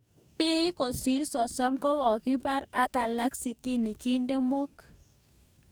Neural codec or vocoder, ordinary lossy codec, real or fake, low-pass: codec, 44.1 kHz, 2.6 kbps, DAC; none; fake; none